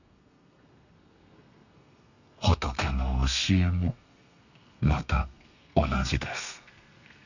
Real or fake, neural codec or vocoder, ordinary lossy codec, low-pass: fake; codec, 32 kHz, 1.9 kbps, SNAC; none; 7.2 kHz